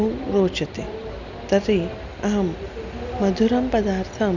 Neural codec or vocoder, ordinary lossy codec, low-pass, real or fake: none; none; 7.2 kHz; real